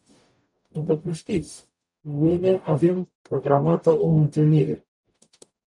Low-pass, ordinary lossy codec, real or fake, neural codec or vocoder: 10.8 kHz; MP3, 96 kbps; fake; codec, 44.1 kHz, 0.9 kbps, DAC